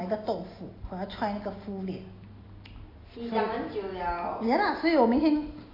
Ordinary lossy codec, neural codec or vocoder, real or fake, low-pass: AAC, 24 kbps; none; real; 5.4 kHz